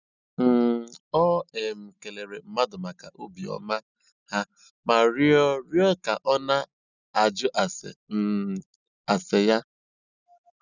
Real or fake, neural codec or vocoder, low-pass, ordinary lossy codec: real; none; 7.2 kHz; none